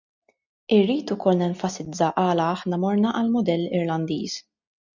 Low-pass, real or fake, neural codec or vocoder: 7.2 kHz; real; none